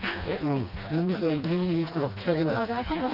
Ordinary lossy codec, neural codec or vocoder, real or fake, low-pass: Opus, 64 kbps; codec, 16 kHz, 2 kbps, FreqCodec, smaller model; fake; 5.4 kHz